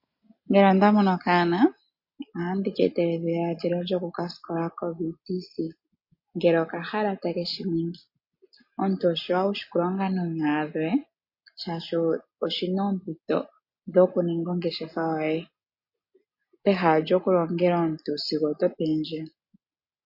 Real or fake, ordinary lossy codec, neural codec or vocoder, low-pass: real; MP3, 32 kbps; none; 5.4 kHz